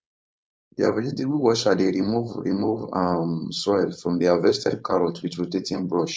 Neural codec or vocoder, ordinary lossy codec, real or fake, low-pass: codec, 16 kHz, 4.8 kbps, FACodec; none; fake; none